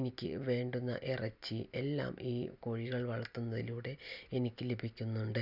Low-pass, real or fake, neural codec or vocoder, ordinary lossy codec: 5.4 kHz; real; none; none